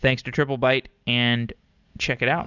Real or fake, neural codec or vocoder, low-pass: real; none; 7.2 kHz